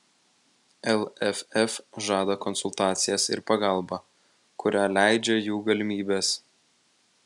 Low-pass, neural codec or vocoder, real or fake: 10.8 kHz; none; real